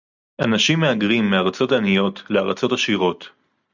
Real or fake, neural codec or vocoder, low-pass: real; none; 7.2 kHz